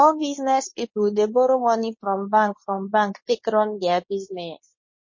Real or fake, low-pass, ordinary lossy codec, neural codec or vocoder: fake; 7.2 kHz; MP3, 32 kbps; codec, 24 kHz, 0.9 kbps, WavTokenizer, medium speech release version 2